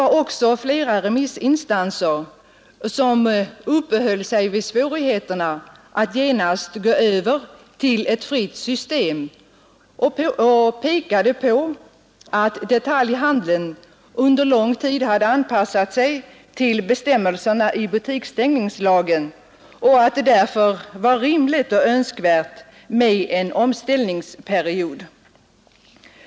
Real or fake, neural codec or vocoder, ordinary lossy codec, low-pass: real; none; none; none